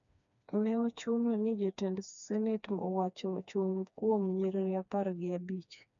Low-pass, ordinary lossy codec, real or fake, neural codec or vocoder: 7.2 kHz; none; fake; codec, 16 kHz, 2 kbps, FreqCodec, smaller model